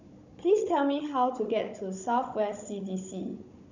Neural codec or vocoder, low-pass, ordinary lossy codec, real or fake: codec, 16 kHz, 16 kbps, FunCodec, trained on Chinese and English, 50 frames a second; 7.2 kHz; none; fake